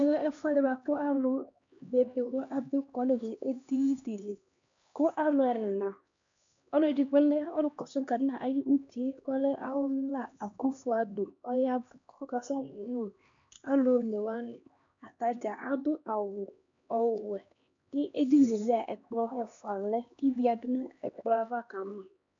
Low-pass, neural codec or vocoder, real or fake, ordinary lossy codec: 7.2 kHz; codec, 16 kHz, 2 kbps, X-Codec, HuBERT features, trained on LibriSpeech; fake; MP3, 64 kbps